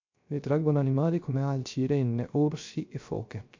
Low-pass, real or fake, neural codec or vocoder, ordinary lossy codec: 7.2 kHz; fake; codec, 16 kHz, 0.3 kbps, FocalCodec; MP3, 48 kbps